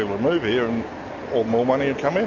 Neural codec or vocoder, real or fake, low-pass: none; real; 7.2 kHz